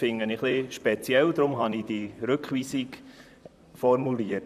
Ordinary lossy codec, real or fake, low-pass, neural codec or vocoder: none; fake; 14.4 kHz; vocoder, 44.1 kHz, 128 mel bands, Pupu-Vocoder